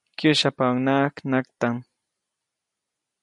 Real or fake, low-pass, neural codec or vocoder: real; 10.8 kHz; none